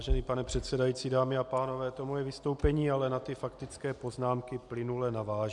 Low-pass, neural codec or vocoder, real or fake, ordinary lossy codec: 10.8 kHz; none; real; MP3, 96 kbps